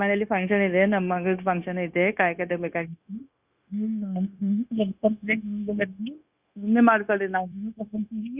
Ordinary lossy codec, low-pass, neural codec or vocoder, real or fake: Opus, 64 kbps; 3.6 kHz; codec, 16 kHz, 0.9 kbps, LongCat-Audio-Codec; fake